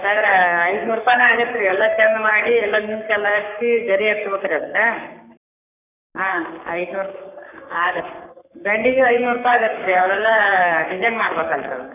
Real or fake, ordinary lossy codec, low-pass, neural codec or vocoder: fake; none; 3.6 kHz; codec, 44.1 kHz, 3.4 kbps, Pupu-Codec